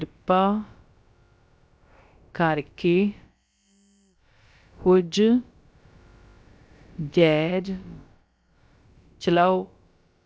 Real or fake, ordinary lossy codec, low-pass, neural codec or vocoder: fake; none; none; codec, 16 kHz, about 1 kbps, DyCAST, with the encoder's durations